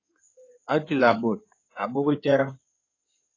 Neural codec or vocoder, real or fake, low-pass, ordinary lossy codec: codec, 16 kHz in and 24 kHz out, 2.2 kbps, FireRedTTS-2 codec; fake; 7.2 kHz; AAC, 32 kbps